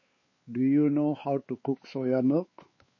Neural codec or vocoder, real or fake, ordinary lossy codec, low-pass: codec, 16 kHz, 4 kbps, X-Codec, WavLM features, trained on Multilingual LibriSpeech; fake; MP3, 32 kbps; 7.2 kHz